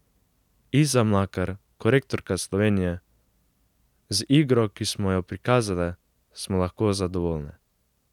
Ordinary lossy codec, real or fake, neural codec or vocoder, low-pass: none; real; none; 19.8 kHz